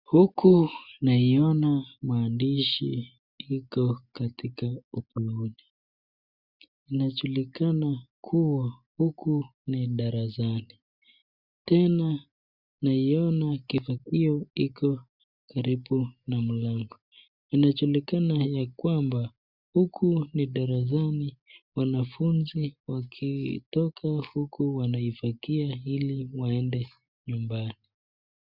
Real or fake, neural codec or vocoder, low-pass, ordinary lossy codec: real; none; 5.4 kHz; Opus, 64 kbps